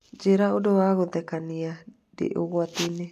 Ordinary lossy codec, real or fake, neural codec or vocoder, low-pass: none; real; none; 14.4 kHz